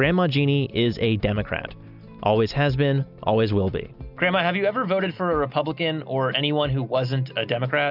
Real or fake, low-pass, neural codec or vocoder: real; 5.4 kHz; none